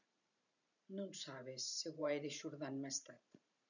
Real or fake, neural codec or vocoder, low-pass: fake; vocoder, 44.1 kHz, 128 mel bands every 512 samples, BigVGAN v2; 7.2 kHz